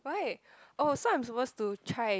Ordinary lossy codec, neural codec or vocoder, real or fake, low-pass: none; none; real; none